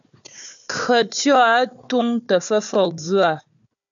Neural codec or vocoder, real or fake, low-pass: codec, 16 kHz, 4 kbps, FunCodec, trained on Chinese and English, 50 frames a second; fake; 7.2 kHz